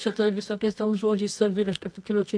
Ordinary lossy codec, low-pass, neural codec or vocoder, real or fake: AAC, 64 kbps; 9.9 kHz; codec, 24 kHz, 0.9 kbps, WavTokenizer, medium music audio release; fake